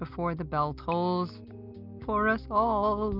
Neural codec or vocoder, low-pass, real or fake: none; 5.4 kHz; real